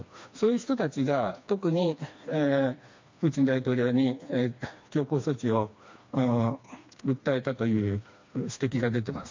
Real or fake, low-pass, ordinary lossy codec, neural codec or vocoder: fake; 7.2 kHz; MP3, 48 kbps; codec, 16 kHz, 2 kbps, FreqCodec, smaller model